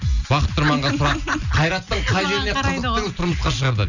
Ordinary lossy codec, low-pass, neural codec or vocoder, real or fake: none; 7.2 kHz; none; real